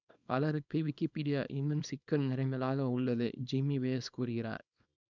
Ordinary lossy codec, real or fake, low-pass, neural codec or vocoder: none; fake; 7.2 kHz; codec, 24 kHz, 0.9 kbps, WavTokenizer, small release